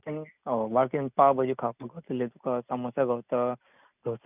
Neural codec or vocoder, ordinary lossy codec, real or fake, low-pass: none; none; real; 3.6 kHz